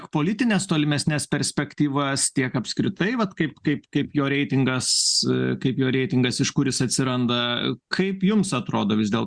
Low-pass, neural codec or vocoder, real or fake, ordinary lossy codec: 9.9 kHz; none; real; Opus, 64 kbps